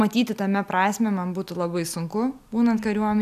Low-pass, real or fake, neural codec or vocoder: 14.4 kHz; real; none